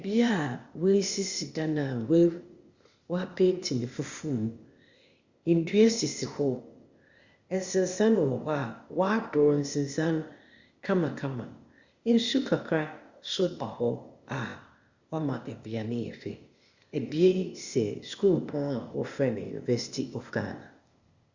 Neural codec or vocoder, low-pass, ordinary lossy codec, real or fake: codec, 16 kHz, 0.8 kbps, ZipCodec; 7.2 kHz; Opus, 64 kbps; fake